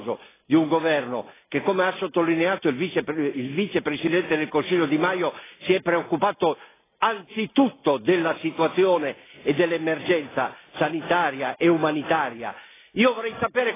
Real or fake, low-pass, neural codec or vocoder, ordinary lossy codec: real; 3.6 kHz; none; AAC, 16 kbps